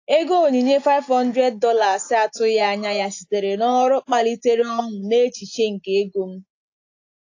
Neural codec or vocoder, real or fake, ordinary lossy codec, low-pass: none; real; AAC, 48 kbps; 7.2 kHz